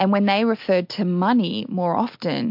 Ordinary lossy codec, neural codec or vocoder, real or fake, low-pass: MP3, 48 kbps; none; real; 5.4 kHz